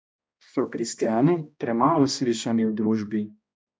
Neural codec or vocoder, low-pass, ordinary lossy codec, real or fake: codec, 16 kHz, 1 kbps, X-Codec, HuBERT features, trained on balanced general audio; none; none; fake